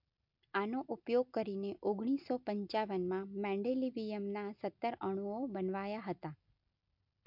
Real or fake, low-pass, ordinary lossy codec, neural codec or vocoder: real; 5.4 kHz; MP3, 48 kbps; none